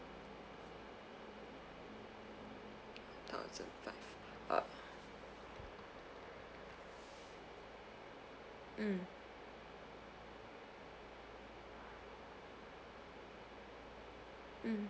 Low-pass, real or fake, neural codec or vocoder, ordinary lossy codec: none; real; none; none